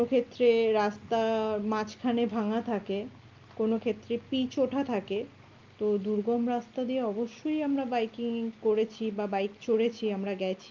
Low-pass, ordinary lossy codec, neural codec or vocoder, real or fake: 7.2 kHz; Opus, 32 kbps; none; real